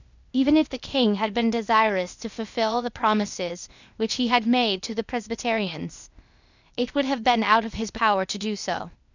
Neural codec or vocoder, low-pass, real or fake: codec, 16 kHz, 0.8 kbps, ZipCodec; 7.2 kHz; fake